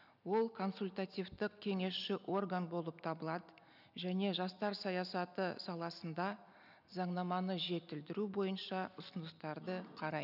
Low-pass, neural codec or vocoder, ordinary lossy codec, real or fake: 5.4 kHz; none; none; real